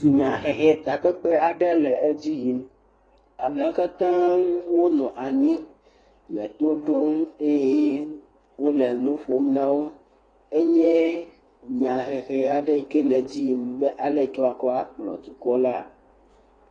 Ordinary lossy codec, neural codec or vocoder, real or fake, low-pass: AAC, 32 kbps; codec, 16 kHz in and 24 kHz out, 1.1 kbps, FireRedTTS-2 codec; fake; 9.9 kHz